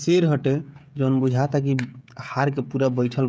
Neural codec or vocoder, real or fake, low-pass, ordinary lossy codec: codec, 16 kHz, 16 kbps, FreqCodec, smaller model; fake; none; none